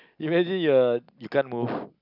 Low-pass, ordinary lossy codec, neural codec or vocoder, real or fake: 5.4 kHz; none; none; real